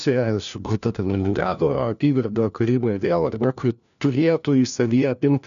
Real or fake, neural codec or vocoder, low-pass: fake; codec, 16 kHz, 1 kbps, FunCodec, trained on LibriTTS, 50 frames a second; 7.2 kHz